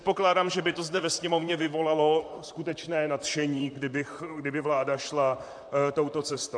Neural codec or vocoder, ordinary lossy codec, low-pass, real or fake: vocoder, 44.1 kHz, 128 mel bands, Pupu-Vocoder; AAC, 64 kbps; 9.9 kHz; fake